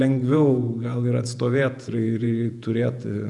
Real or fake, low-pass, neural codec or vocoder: fake; 10.8 kHz; vocoder, 48 kHz, 128 mel bands, Vocos